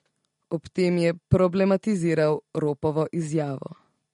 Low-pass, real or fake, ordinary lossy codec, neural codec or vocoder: 14.4 kHz; real; MP3, 48 kbps; none